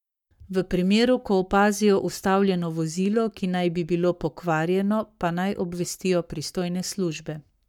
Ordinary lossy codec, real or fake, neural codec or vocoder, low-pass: none; fake; codec, 44.1 kHz, 7.8 kbps, Pupu-Codec; 19.8 kHz